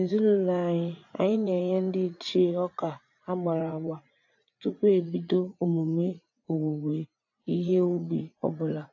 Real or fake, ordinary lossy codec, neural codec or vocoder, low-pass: fake; AAC, 48 kbps; vocoder, 44.1 kHz, 80 mel bands, Vocos; 7.2 kHz